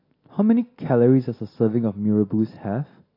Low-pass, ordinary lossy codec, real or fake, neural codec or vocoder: 5.4 kHz; AAC, 32 kbps; real; none